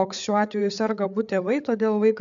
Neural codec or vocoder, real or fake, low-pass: codec, 16 kHz, 8 kbps, FreqCodec, larger model; fake; 7.2 kHz